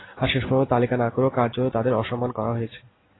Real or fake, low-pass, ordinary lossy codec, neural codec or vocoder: real; 7.2 kHz; AAC, 16 kbps; none